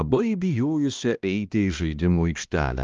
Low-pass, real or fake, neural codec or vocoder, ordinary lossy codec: 7.2 kHz; fake; codec, 16 kHz, 1 kbps, X-Codec, HuBERT features, trained on balanced general audio; Opus, 32 kbps